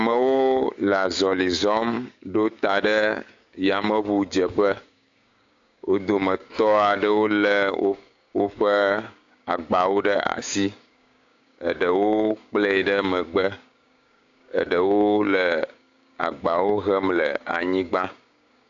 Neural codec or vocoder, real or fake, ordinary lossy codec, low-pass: codec, 16 kHz, 6 kbps, DAC; fake; AAC, 48 kbps; 7.2 kHz